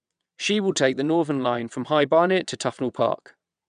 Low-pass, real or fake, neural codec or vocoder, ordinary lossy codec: 9.9 kHz; fake; vocoder, 22.05 kHz, 80 mel bands, WaveNeXt; none